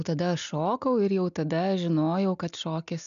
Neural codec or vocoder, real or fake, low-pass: none; real; 7.2 kHz